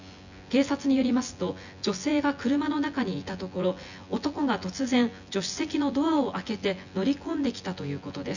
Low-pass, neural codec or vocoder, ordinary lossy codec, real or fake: 7.2 kHz; vocoder, 24 kHz, 100 mel bands, Vocos; none; fake